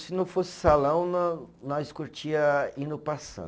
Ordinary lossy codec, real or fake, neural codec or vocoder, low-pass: none; real; none; none